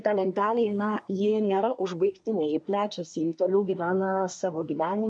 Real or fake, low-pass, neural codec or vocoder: fake; 9.9 kHz; codec, 24 kHz, 1 kbps, SNAC